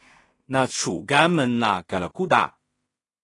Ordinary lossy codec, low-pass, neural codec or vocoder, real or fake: AAC, 32 kbps; 10.8 kHz; codec, 16 kHz in and 24 kHz out, 0.4 kbps, LongCat-Audio-Codec, two codebook decoder; fake